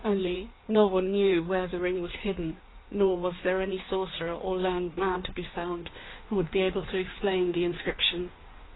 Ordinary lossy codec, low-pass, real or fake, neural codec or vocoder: AAC, 16 kbps; 7.2 kHz; fake; codec, 16 kHz in and 24 kHz out, 1.1 kbps, FireRedTTS-2 codec